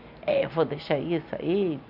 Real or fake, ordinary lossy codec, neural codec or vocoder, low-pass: real; none; none; 5.4 kHz